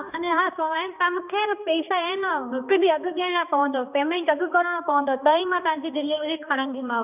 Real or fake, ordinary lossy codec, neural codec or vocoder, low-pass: fake; none; codec, 16 kHz, 2 kbps, X-Codec, HuBERT features, trained on balanced general audio; 3.6 kHz